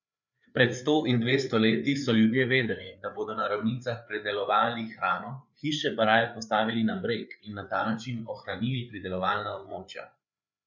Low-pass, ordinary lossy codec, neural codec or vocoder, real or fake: 7.2 kHz; none; codec, 16 kHz, 4 kbps, FreqCodec, larger model; fake